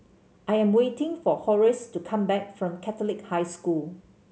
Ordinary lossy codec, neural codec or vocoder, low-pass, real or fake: none; none; none; real